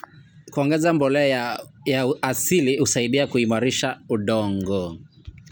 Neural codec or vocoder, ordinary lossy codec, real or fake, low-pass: none; none; real; none